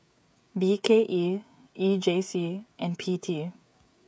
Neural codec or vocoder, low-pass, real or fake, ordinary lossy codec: codec, 16 kHz, 16 kbps, FreqCodec, smaller model; none; fake; none